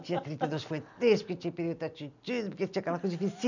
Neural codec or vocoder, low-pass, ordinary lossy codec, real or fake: none; 7.2 kHz; none; real